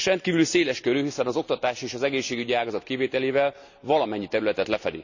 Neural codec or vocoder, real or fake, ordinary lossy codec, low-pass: none; real; none; 7.2 kHz